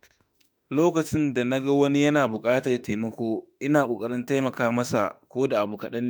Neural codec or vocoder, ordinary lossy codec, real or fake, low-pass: autoencoder, 48 kHz, 32 numbers a frame, DAC-VAE, trained on Japanese speech; none; fake; none